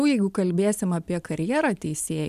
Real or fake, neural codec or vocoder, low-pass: real; none; 14.4 kHz